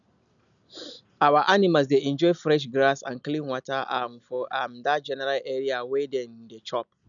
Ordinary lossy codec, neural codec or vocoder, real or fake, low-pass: none; none; real; 7.2 kHz